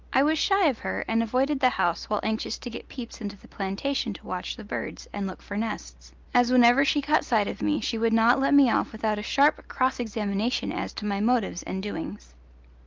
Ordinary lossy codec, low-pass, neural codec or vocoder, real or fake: Opus, 24 kbps; 7.2 kHz; none; real